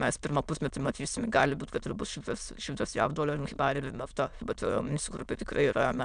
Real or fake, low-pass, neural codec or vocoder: fake; 9.9 kHz; autoencoder, 22.05 kHz, a latent of 192 numbers a frame, VITS, trained on many speakers